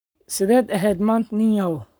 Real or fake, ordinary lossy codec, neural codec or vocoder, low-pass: fake; none; codec, 44.1 kHz, 7.8 kbps, Pupu-Codec; none